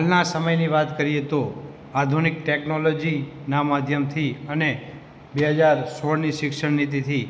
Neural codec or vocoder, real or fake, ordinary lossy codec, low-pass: none; real; none; none